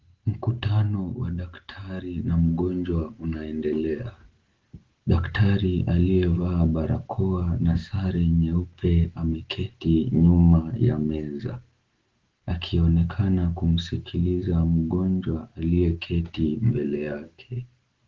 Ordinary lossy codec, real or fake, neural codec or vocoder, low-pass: Opus, 16 kbps; real; none; 7.2 kHz